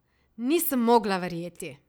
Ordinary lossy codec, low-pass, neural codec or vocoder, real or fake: none; none; none; real